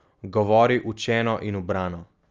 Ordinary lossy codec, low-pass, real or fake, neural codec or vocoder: Opus, 32 kbps; 7.2 kHz; real; none